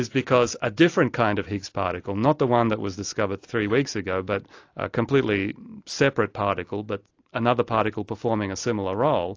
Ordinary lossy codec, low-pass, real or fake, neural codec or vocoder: AAC, 48 kbps; 7.2 kHz; real; none